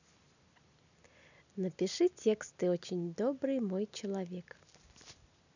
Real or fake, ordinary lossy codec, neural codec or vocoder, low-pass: real; none; none; 7.2 kHz